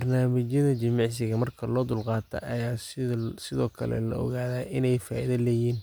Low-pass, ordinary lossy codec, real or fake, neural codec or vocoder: none; none; real; none